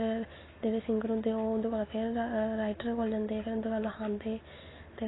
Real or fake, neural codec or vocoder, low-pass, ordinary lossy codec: real; none; 7.2 kHz; AAC, 16 kbps